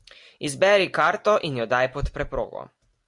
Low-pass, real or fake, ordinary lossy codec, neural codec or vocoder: 10.8 kHz; real; MP3, 64 kbps; none